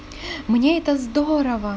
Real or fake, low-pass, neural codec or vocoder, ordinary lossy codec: real; none; none; none